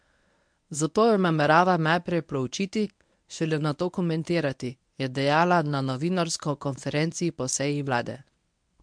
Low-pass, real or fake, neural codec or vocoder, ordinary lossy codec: 9.9 kHz; fake; codec, 24 kHz, 0.9 kbps, WavTokenizer, medium speech release version 1; MP3, 64 kbps